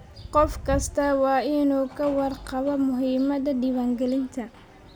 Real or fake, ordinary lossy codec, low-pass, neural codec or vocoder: real; none; none; none